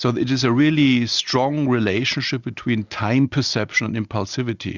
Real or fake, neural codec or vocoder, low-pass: real; none; 7.2 kHz